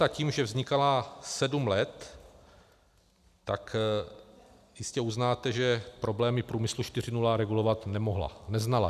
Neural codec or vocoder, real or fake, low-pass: none; real; 14.4 kHz